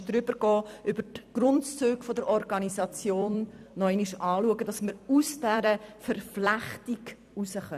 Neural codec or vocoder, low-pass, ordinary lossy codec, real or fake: vocoder, 44.1 kHz, 128 mel bands every 256 samples, BigVGAN v2; 14.4 kHz; none; fake